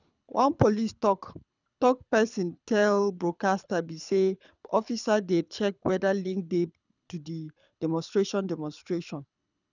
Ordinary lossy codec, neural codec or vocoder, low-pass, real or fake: none; codec, 24 kHz, 6 kbps, HILCodec; 7.2 kHz; fake